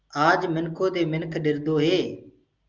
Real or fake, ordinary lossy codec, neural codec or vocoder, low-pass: real; Opus, 24 kbps; none; 7.2 kHz